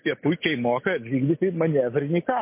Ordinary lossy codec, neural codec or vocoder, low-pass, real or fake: MP3, 24 kbps; none; 3.6 kHz; real